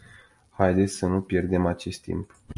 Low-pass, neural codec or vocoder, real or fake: 10.8 kHz; none; real